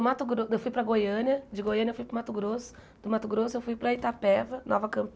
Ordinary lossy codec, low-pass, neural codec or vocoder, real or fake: none; none; none; real